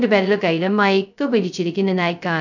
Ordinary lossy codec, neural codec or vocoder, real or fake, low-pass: none; codec, 16 kHz, 0.2 kbps, FocalCodec; fake; 7.2 kHz